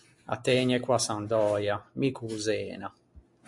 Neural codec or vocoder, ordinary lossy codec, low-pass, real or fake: none; MP3, 48 kbps; 10.8 kHz; real